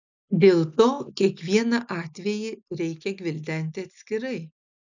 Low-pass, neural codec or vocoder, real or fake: 7.2 kHz; none; real